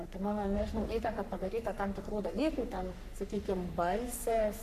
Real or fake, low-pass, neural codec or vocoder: fake; 14.4 kHz; codec, 44.1 kHz, 3.4 kbps, Pupu-Codec